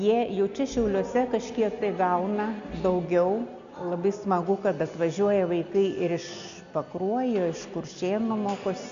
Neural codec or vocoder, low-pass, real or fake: none; 7.2 kHz; real